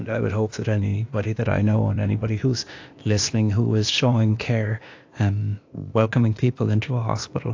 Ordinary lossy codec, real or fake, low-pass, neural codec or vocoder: AAC, 48 kbps; fake; 7.2 kHz; codec, 16 kHz, 0.8 kbps, ZipCodec